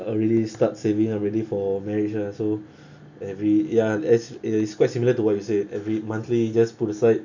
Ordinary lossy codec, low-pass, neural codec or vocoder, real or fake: none; 7.2 kHz; none; real